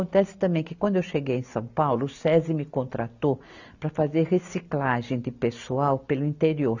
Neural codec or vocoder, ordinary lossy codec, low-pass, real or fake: none; none; 7.2 kHz; real